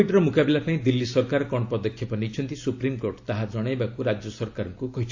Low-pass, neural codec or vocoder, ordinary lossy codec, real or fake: 7.2 kHz; none; AAC, 48 kbps; real